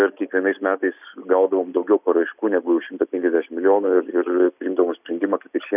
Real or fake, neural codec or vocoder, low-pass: real; none; 3.6 kHz